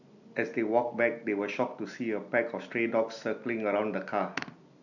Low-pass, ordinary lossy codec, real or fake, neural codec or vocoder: 7.2 kHz; none; real; none